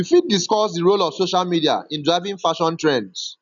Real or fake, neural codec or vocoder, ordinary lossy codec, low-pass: real; none; none; 7.2 kHz